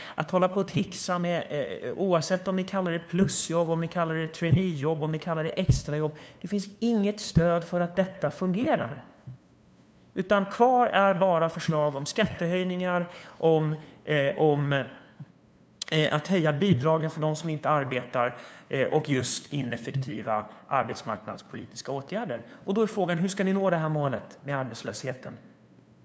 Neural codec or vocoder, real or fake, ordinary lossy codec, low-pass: codec, 16 kHz, 2 kbps, FunCodec, trained on LibriTTS, 25 frames a second; fake; none; none